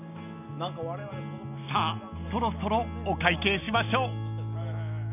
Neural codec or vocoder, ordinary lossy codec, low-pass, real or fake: none; none; 3.6 kHz; real